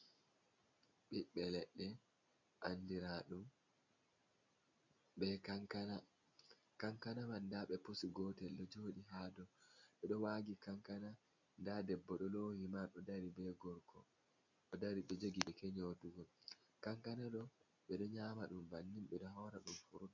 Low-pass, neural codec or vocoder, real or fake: 7.2 kHz; none; real